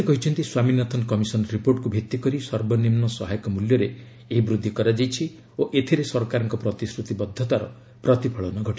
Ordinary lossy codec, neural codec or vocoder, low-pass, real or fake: none; none; none; real